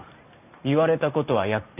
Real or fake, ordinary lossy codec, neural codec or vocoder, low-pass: fake; none; vocoder, 44.1 kHz, 128 mel bands every 512 samples, BigVGAN v2; 3.6 kHz